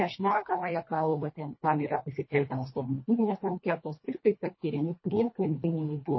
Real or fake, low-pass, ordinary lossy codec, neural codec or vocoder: fake; 7.2 kHz; MP3, 24 kbps; codec, 24 kHz, 1.5 kbps, HILCodec